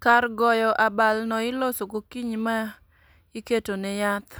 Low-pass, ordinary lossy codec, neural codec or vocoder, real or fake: none; none; none; real